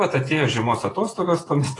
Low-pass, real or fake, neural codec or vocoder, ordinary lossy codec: 10.8 kHz; fake; vocoder, 48 kHz, 128 mel bands, Vocos; AAC, 32 kbps